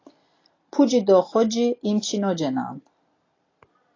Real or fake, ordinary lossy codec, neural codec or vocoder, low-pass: real; AAC, 32 kbps; none; 7.2 kHz